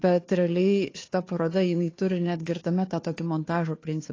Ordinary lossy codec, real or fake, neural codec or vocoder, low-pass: AAC, 32 kbps; fake; codec, 16 kHz, 2 kbps, FunCodec, trained on Chinese and English, 25 frames a second; 7.2 kHz